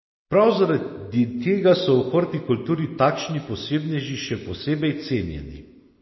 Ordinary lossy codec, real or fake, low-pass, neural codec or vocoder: MP3, 24 kbps; real; 7.2 kHz; none